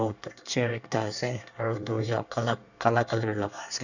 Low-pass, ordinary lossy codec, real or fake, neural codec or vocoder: 7.2 kHz; none; fake; codec, 24 kHz, 1 kbps, SNAC